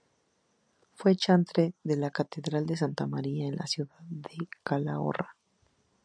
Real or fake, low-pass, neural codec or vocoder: real; 9.9 kHz; none